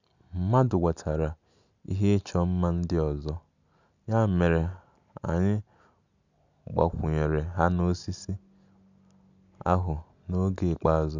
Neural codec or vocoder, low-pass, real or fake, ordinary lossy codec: none; 7.2 kHz; real; none